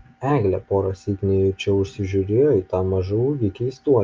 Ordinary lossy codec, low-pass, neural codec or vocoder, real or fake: Opus, 24 kbps; 7.2 kHz; none; real